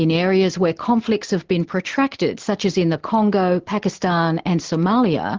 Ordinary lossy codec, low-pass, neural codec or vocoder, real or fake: Opus, 16 kbps; 7.2 kHz; none; real